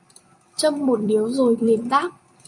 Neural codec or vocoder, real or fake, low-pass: vocoder, 44.1 kHz, 128 mel bands every 256 samples, BigVGAN v2; fake; 10.8 kHz